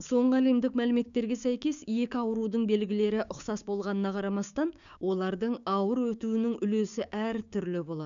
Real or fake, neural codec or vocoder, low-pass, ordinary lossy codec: fake; codec, 16 kHz, 6 kbps, DAC; 7.2 kHz; none